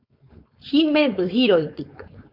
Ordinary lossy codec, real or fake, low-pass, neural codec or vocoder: MP3, 48 kbps; fake; 5.4 kHz; codec, 16 kHz, 4.8 kbps, FACodec